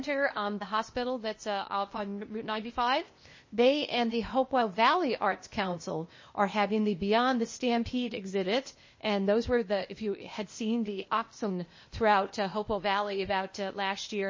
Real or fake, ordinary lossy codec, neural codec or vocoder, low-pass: fake; MP3, 32 kbps; codec, 16 kHz, 0.8 kbps, ZipCodec; 7.2 kHz